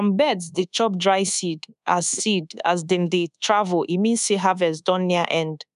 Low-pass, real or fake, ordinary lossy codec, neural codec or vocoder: 10.8 kHz; fake; none; codec, 24 kHz, 1.2 kbps, DualCodec